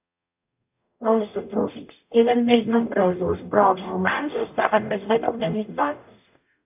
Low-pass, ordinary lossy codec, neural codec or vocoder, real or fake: 3.6 kHz; AAC, 32 kbps; codec, 44.1 kHz, 0.9 kbps, DAC; fake